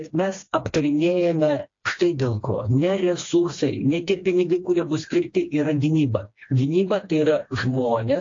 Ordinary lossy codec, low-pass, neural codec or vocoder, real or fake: AAC, 48 kbps; 7.2 kHz; codec, 16 kHz, 2 kbps, FreqCodec, smaller model; fake